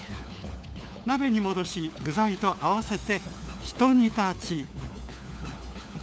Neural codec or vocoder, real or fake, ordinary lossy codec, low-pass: codec, 16 kHz, 4 kbps, FunCodec, trained on LibriTTS, 50 frames a second; fake; none; none